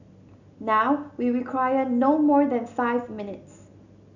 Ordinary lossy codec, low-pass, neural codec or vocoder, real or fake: none; 7.2 kHz; none; real